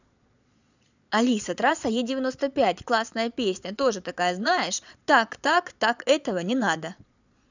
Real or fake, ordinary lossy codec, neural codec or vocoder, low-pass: fake; none; vocoder, 22.05 kHz, 80 mel bands, Vocos; 7.2 kHz